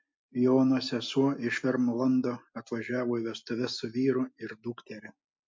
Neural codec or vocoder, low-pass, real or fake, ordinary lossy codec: none; 7.2 kHz; real; MP3, 48 kbps